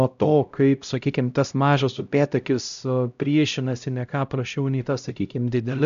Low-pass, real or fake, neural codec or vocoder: 7.2 kHz; fake; codec, 16 kHz, 0.5 kbps, X-Codec, HuBERT features, trained on LibriSpeech